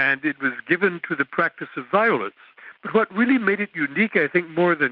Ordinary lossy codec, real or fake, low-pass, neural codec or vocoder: Opus, 24 kbps; real; 5.4 kHz; none